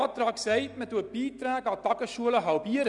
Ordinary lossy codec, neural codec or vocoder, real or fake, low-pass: none; none; real; 10.8 kHz